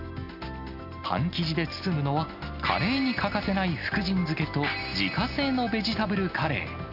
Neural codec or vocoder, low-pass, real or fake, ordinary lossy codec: none; 5.4 kHz; real; none